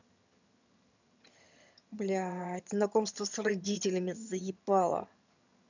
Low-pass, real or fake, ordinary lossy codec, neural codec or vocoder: 7.2 kHz; fake; none; vocoder, 22.05 kHz, 80 mel bands, HiFi-GAN